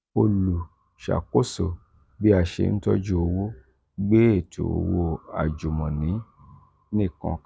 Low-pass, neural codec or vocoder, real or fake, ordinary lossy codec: none; none; real; none